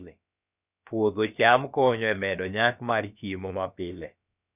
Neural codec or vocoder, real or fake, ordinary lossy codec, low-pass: codec, 16 kHz, about 1 kbps, DyCAST, with the encoder's durations; fake; none; 3.6 kHz